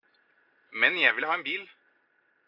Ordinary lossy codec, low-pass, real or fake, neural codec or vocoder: AAC, 48 kbps; 5.4 kHz; real; none